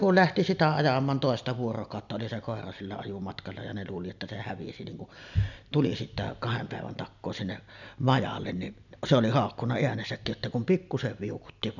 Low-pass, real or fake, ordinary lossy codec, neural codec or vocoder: 7.2 kHz; real; none; none